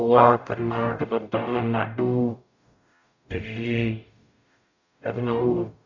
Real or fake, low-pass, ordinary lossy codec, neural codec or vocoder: fake; 7.2 kHz; none; codec, 44.1 kHz, 0.9 kbps, DAC